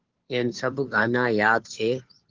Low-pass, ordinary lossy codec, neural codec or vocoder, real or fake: 7.2 kHz; Opus, 16 kbps; codec, 16 kHz, 2 kbps, FunCodec, trained on Chinese and English, 25 frames a second; fake